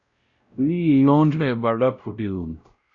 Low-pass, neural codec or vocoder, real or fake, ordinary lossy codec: 7.2 kHz; codec, 16 kHz, 0.5 kbps, X-Codec, WavLM features, trained on Multilingual LibriSpeech; fake; Opus, 64 kbps